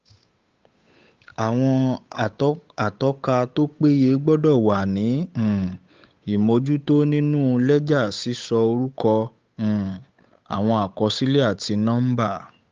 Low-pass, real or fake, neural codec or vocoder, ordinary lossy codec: 7.2 kHz; fake; codec, 16 kHz, 8 kbps, FunCodec, trained on Chinese and English, 25 frames a second; Opus, 24 kbps